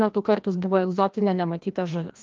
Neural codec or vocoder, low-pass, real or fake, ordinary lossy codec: codec, 16 kHz, 1 kbps, FreqCodec, larger model; 7.2 kHz; fake; Opus, 32 kbps